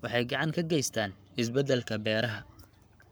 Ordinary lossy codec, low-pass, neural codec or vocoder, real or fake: none; none; codec, 44.1 kHz, 7.8 kbps, Pupu-Codec; fake